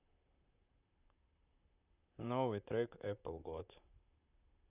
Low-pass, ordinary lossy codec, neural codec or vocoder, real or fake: 3.6 kHz; none; vocoder, 44.1 kHz, 128 mel bands, Pupu-Vocoder; fake